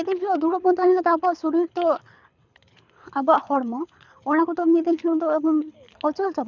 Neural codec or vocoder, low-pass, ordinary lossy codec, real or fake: codec, 24 kHz, 6 kbps, HILCodec; 7.2 kHz; none; fake